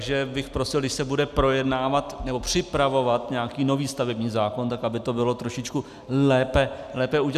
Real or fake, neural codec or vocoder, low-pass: real; none; 14.4 kHz